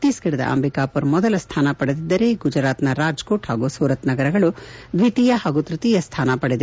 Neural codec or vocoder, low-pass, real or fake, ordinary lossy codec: none; none; real; none